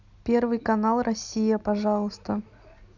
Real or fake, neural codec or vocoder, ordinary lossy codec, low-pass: real; none; none; 7.2 kHz